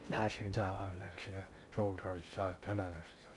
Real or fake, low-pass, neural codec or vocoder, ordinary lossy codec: fake; 10.8 kHz; codec, 16 kHz in and 24 kHz out, 0.6 kbps, FocalCodec, streaming, 2048 codes; none